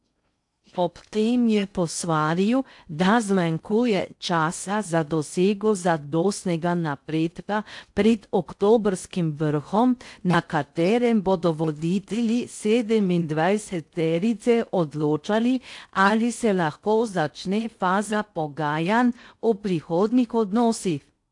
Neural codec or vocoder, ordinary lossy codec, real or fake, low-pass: codec, 16 kHz in and 24 kHz out, 0.6 kbps, FocalCodec, streaming, 2048 codes; AAC, 64 kbps; fake; 10.8 kHz